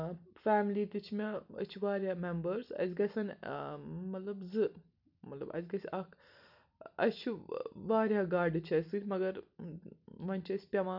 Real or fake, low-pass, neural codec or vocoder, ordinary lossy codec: real; 5.4 kHz; none; none